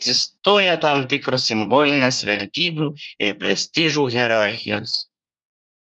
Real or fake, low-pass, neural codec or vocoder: fake; 10.8 kHz; codec, 24 kHz, 1 kbps, SNAC